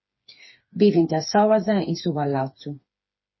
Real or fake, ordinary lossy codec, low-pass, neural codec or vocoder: fake; MP3, 24 kbps; 7.2 kHz; codec, 16 kHz, 4 kbps, FreqCodec, smaller model